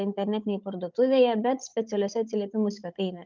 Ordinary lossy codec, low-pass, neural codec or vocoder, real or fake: Opus, 24 kbps; 7.2 kHz; codec, 16 kHz, 16 kbps, FunCodec, trained on Chinese and English, 50 frames a second; fake